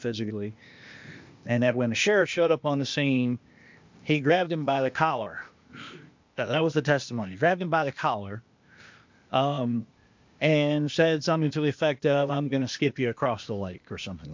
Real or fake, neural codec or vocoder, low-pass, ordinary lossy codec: fake; codec, 16 kHz, 0.8 kbps, ZipCodec; 7.2 kHz; MP3, 64 kbps